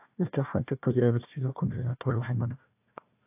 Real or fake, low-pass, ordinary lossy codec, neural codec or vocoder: fake; 3.6 kHz; AAC, 32 kbps; codec, 16 kHz, 1 kbps, FunCodec, trained on Chinese and English, 50 frames a second